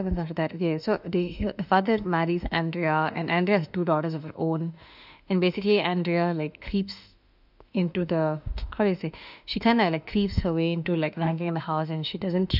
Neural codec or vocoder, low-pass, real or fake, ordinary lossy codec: autoencoder, 48 kHz, 32 numbers a frame, DAC-VAE, trained on Japanese speech; 5.4 kHz; fake; none